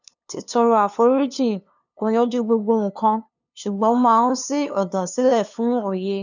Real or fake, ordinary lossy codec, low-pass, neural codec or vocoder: fake; none; 7.2 kHz; codec, 16 kHz, 2 kbps, FunCodec, trained on LibriTTS, 25 frames a second